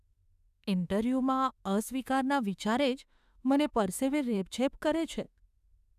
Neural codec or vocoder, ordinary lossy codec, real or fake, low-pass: autoencoder, 48 kHz, 32 numbers a frame, DAC-VAE, trained on Japanese speech; none; fake; 14.4 kHz